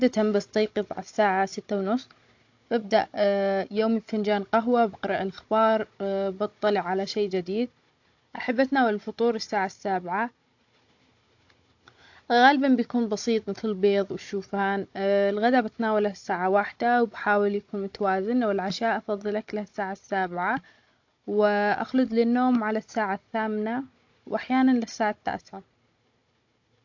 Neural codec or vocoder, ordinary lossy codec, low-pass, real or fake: codec, 16 kHz, 16 kbps, FunCodec, trained on Chinese and English, 50 frames a second; AAC, 48 kbps; 7.2 kHz; fake